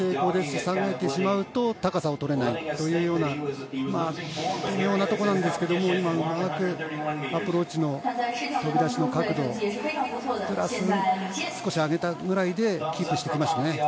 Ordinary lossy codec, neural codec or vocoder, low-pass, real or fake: none; none; none; real